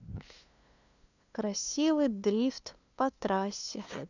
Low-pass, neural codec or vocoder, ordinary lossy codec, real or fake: 7.2 kHz; codec, 16 kHz, 2 kbps, FunCodec, trained on LibriTTS, 25 frames a second; none; fake